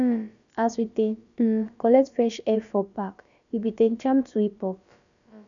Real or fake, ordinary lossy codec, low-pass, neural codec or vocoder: fake; none; 7.2 kHz; codec, 16 kHz, about 1 kbps, DyCAST, with the encoder's durations